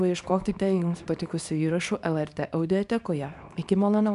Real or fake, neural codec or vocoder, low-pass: fake; codec, 24 kHz, 0.9 kbps, WavTokenizer, small release; 10.8 kHz